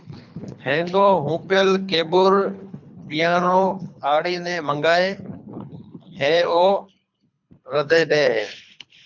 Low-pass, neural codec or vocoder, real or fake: 7.2 kHz; codec, 24 kHz, 3 kbps, HILCodec; fake